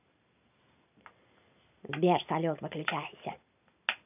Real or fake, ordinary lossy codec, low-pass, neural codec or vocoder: real; none; 3.6 kHz; none